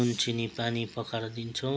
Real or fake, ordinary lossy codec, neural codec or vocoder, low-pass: real; none; none; none